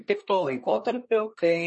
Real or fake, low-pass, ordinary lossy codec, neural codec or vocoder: fake; 10.8 kHz; MP3, 32 kbps; codec, 24 kHz, 1 kbps, SNAC